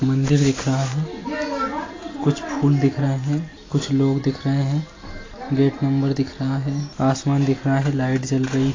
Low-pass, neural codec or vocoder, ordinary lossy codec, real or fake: 7.2 kHz; none; AAC, 32 kbps; real